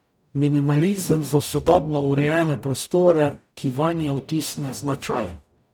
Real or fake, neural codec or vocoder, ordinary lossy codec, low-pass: fake; codec, 44.1 kHz, 0.9 kbps, DAC; none; none